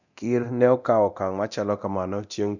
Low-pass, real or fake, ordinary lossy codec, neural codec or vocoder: 7.2 kHz; fake; none; codec, 24 kHz, 0.9 kbps, DualCodec